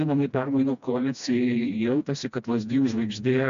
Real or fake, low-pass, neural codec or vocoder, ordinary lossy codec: fake; 7.2 kHz; codec, 16 kHz, 1 kbps, FreqCodec, smaller model; MP3, 64 kbps